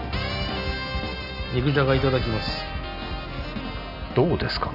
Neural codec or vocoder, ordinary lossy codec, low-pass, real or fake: none; none; 5.4 kHz; real